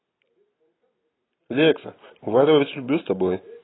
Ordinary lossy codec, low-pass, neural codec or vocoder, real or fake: AAC, 16 kbps; 7.2 kHz; none; real